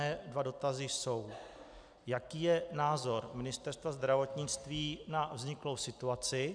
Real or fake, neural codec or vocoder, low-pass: real; none; 9.9 kHz